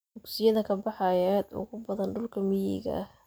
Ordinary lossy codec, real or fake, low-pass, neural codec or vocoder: none; real; none; none